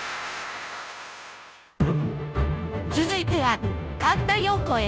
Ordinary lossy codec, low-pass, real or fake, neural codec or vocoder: none; none; fake; codec, 16 kHz, 0.5 kbps, FunCodec, trained on Chinese and English, 25 frames a second